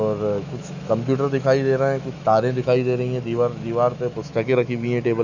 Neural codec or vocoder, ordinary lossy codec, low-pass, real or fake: autoencoder, 48 kHz, 128 numbers a frame, DAC-VAE, trained on Japanese speech; none; 7.2 kHz; fake